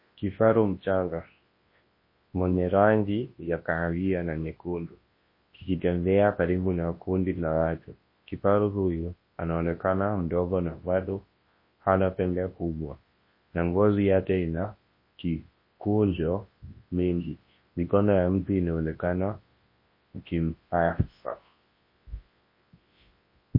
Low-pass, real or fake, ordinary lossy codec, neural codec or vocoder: 5.4 kHz; fake; MP3, 24 kbps; codec, 24 kHz, 0.9 kbps, WavTokenizer, large speech release